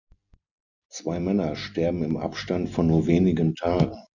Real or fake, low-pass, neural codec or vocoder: real; 7.2 kHz; none